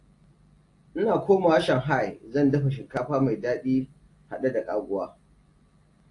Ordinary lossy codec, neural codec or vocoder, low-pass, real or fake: AAC, 48 kbps; none; 10.8 kHz; real